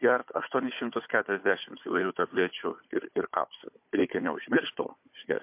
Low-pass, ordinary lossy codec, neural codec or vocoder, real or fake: 3.6 kHz; MP3, 32 kbps; codec, 16 kHz, 4 kbps, FunCodec, trained on Chinese and English, 50 frames a second; fake